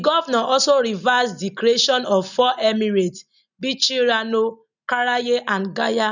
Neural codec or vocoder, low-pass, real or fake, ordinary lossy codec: none; 7.2 kHz; real; none